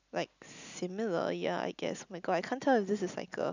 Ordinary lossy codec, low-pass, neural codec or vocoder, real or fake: none; 7.2 kHz; none; real